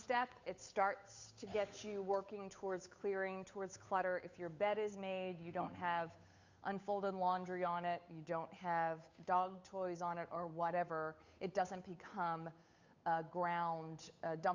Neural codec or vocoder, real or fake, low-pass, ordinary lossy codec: codec, 16 kHz, 8 kbps, FunCodec, trained on Chinese and English, 25 frames a second; fake; 7.2 kHz; Opus, 64 kbps